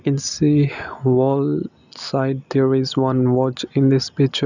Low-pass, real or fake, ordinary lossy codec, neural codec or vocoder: 7.2 kHz; real; none; none